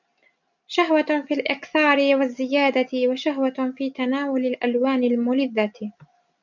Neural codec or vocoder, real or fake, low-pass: none; real; 7.2 kHz